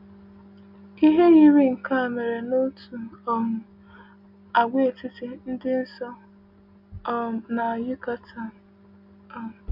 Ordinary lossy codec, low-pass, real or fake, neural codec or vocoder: none; 5.4 kHz; real; none